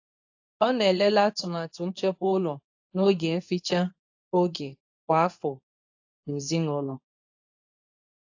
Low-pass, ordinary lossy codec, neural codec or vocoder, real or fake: 7.2 kHz; AAC, 48 kbps; codec, 24 kHz, 0.9 kbps, WavTokenizer, medium speech release version 2; fake